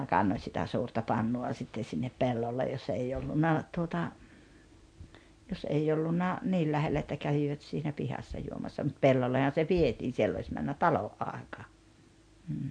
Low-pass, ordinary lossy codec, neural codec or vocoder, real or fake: 9.9 kHz; MP3, 64 kbps; none; real